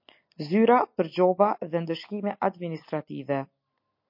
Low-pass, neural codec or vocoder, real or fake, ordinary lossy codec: 5.4 kHz; none; real; MP3, 32 kbps